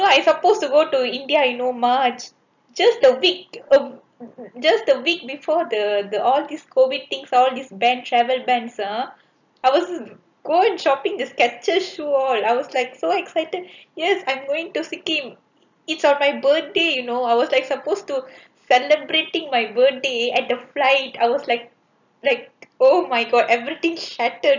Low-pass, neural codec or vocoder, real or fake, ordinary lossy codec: 7.2 kHz; none; real; none